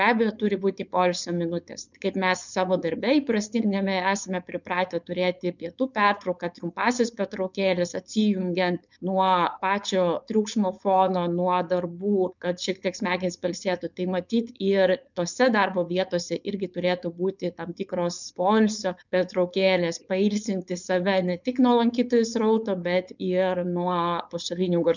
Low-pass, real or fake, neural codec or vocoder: 7.2 kHz; fake; codec, 16 kHz, 4.8 kbps, FACodec